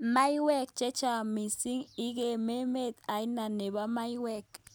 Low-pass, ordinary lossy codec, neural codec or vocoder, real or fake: none; none; none; real